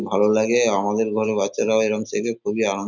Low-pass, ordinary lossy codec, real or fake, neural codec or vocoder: 7.2 kHz; none; real; none